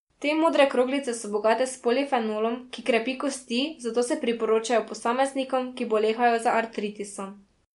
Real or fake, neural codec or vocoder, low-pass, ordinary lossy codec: real; none; 10.8 kHz; MP3, 64 kbps